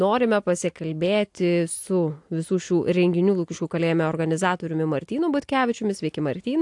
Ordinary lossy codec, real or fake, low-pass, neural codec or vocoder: AAC, 64 kbps; real; 10.8 kHz; none